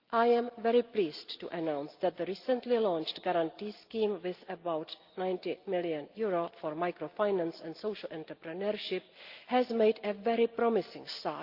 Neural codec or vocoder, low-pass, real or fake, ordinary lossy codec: none; 5.4 kHz; real; Opus, 32 kbps